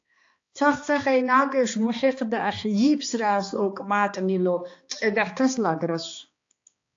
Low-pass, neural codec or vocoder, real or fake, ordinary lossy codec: 7.2 kHz; codec, 16 kHz, 2 kbps, X-Codec, HuBERT features, trained on balanced general audio; fake; AAC, 48 kbps